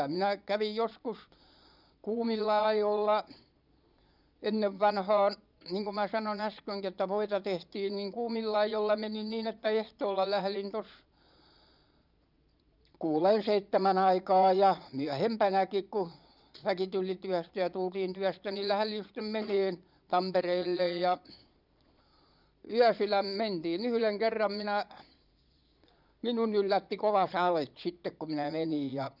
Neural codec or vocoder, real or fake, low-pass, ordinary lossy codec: vocoder, 22.05 kHz, 80 mel bands, Vocos; fake; 5.4 kHz; none